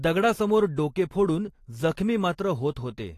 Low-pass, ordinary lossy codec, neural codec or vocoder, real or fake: 14.4 kHz; AAC, 48 kbps; vocoder, 44.1 kHz, 128 mel bands every 512 samples, BigVGAN v2; fake